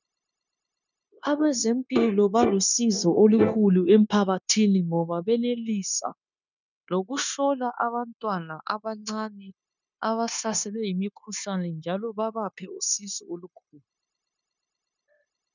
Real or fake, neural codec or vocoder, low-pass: fake; codec, 16 kHz, 0.9 kbps, LongCat-Audio-Codec; 7.2 kHz